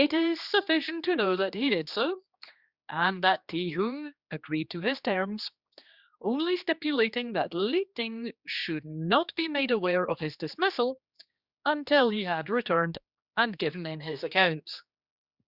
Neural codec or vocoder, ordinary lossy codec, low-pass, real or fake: codec, 16 kHz, 2 kbps, X-Codec, HuBERT features, trained on general audio; Opus, 64 kbps; 5.4 kHz; fake